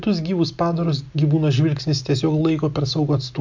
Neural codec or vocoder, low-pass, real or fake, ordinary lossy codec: none; 7.2 kHz; real; MP3, 64 kbps